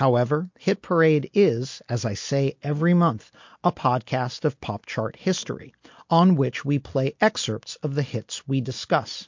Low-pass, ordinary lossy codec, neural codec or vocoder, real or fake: 7.2 kHz; MP3, 48 kbps; none; real